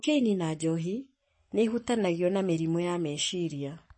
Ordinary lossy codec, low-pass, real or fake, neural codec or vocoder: MP3, 32 kbps; 9.9 kHz; fake; codec, 44.1 kHz, 7.8 kbps, Pupu-Codec